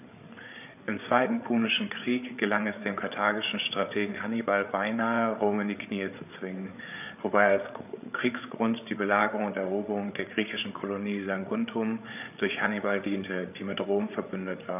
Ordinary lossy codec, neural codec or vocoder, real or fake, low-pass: none; codec, 16 kHz, 8 kbps, FreqCodec, larger model; fake; 3.6 kHz